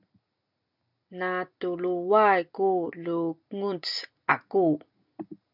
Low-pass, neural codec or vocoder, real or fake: 5.4 kHz; none; real